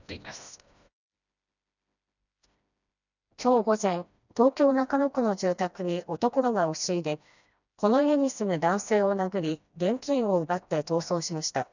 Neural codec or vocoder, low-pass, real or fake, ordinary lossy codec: codec, 16 kHz, 1 kbps, FreqCodec, smaller model; 7.2 kHz; fake; none